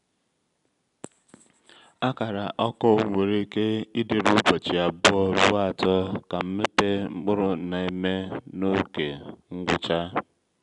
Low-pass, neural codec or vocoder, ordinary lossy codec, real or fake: 10.8 kHz; none; none; real